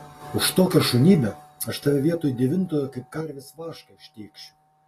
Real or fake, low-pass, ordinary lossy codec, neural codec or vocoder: real; 14.4 kHz; AAC, 48 kbps; none